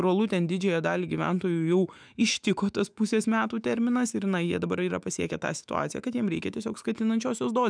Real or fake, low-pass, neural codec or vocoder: fake; 9.9 kHz; autoencoder, 48 kHz, 128 numbers a frame, DAC-VAE, trained on Japanese speech